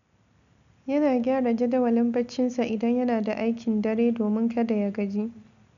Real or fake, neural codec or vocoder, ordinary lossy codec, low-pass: real; none; none; 7.2 kHz